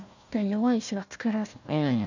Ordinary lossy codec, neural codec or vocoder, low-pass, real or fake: none; codec, 16 kHz, 1 kbps, FunCodec, trained on Chinese and English, 50 frames a second; 7.2 kHz; fake